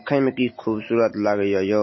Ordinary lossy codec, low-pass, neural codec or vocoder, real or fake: MP3, 24 kbps; 7.2 kHz; none; real